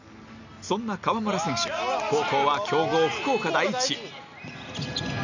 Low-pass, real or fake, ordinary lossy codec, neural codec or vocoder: 7.2 kHz; real; none; none